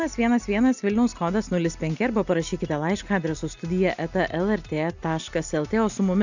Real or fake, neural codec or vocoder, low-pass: real; none; 7.2 kHz